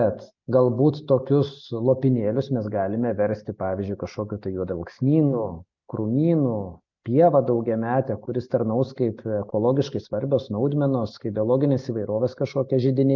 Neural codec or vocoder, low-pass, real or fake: none; 7.2 kHz; real